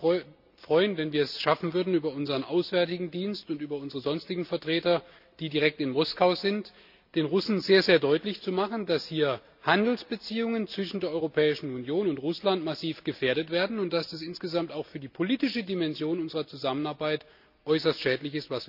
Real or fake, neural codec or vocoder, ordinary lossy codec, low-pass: real; none; none; 5.4 kHz